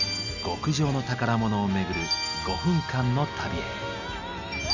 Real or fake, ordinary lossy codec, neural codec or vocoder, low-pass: real; none; none; 7.2 kHz